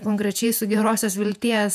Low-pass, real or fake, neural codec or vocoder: 14.4 kHz; fake; vocoder, 48 kHz, 128 mel bands, Vocos